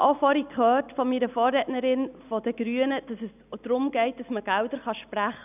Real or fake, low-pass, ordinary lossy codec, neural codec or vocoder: real; 3.6 kHz; none; none